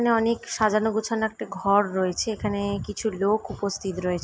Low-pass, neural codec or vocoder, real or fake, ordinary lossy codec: none; none; real; none